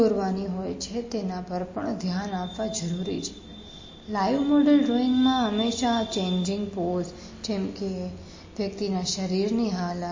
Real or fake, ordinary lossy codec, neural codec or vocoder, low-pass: real; MP3, 32 kbps; none; 7.2 kHz